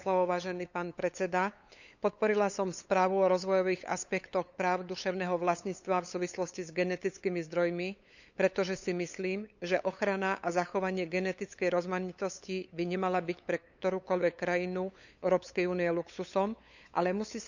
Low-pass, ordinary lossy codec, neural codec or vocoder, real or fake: 7.2 kHz; none; codec, 16 kHz, 8 kbps, FunCodec, trained on LibriTTS, 25 frames a second; fake